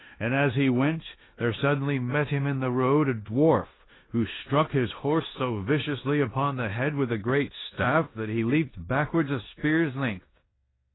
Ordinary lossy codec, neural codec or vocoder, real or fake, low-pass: AAC, 16 kbps; codec, 16 kHz in and 24 kHz out, 0.9 kbps, LongCat-Audio-Codec, four codebook decoder; fake; 7.2 kHz